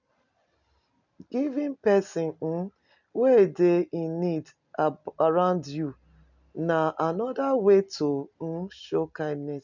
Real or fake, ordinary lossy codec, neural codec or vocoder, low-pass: real; none; none; 7.2 kHz